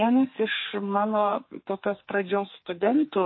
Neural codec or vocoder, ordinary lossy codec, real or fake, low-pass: codec, 32 kHz, 1.9 kbps, SNAC; MP3, 24 kbps; fake; 7.2 kHz